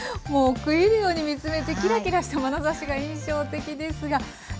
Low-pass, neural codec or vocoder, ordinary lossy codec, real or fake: none; none; none; real